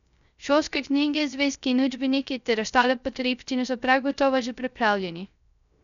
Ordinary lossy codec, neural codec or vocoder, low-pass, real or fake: none; codec, 16 kHz, 0.3 kbps, FocalCodec; 7.2 kHz; fake